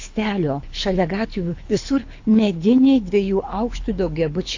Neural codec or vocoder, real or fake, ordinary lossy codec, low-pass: codec, 24 kHz, 6 kbps, HILCodec; fake; AAC, 48 kbps; 7.2 kHz